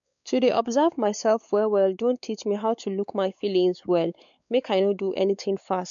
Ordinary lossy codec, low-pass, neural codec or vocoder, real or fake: none; 7.2 kHz; codec, 16 kHz, 4 kbps, X-Codec, WavLM features, trained on Multilingual LibriSpeech; fake